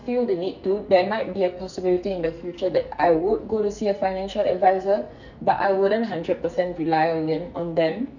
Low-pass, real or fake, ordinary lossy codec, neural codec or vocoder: 7.2 kHz; fake; none; codec, 44.1 kHz, 2.6 kbps, SNAC